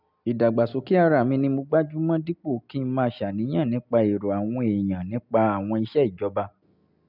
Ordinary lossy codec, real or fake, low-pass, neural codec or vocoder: none; real; 5.4 kHz; none